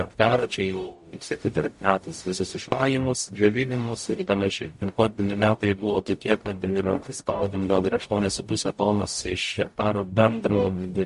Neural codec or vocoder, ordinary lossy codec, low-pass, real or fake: codec, 44.1 kHz, 0.9 kbps, DAC; MP3, 48 kbps; 14.4 kHz; fake